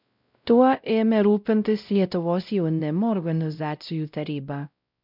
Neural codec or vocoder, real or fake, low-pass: codec, 16 kHz, 0.5 kbps, X-Codec, WavLM features, trained on Multilingual LibriSpeech; fake; 5.4 kHz